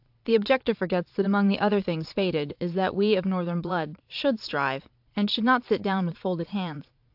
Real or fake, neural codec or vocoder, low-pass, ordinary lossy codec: fake; vocoder, 22.05 kHz, 80 mel bands, Vocos; 5.4 kHz; AAC, 48 kbps